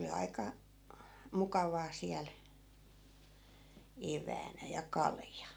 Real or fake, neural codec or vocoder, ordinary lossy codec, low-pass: real; none; none; none